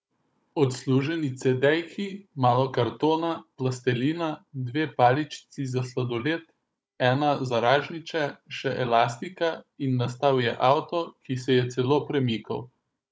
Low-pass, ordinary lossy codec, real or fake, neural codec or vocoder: none; none; fake; codec, 16 kHz, 16 kbps, FunCodec, trained on Chinese and English, 50 frames a second